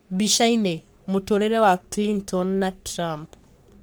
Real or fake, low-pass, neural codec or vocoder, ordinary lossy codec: fake; none; codec, 44.1 kHz, 3.4 kbps, Pupu-Codec; none